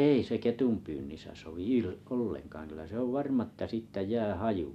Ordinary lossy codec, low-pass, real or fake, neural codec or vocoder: none; 14.4 kHz; real; none